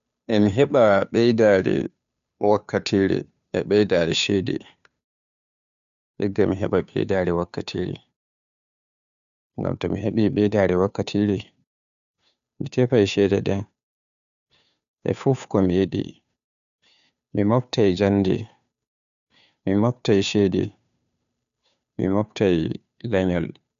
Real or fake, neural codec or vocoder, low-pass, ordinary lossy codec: fake; codec, 16 kHz, 2 kbps, FunCodec, trained on Chinese and English, 25 frames a second; 7.2 kHz; none